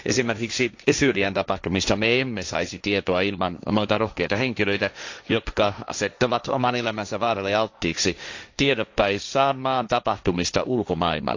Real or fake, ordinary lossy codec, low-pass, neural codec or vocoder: fake; AAC, 48 kbps; 7.2 kHz; codec, 16 kHz, 1.1 kbps, Voila-Tokenizer